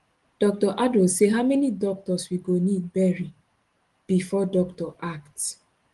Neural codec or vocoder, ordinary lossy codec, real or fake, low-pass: none; Opus, 24 kbps; real; 10.8 kHz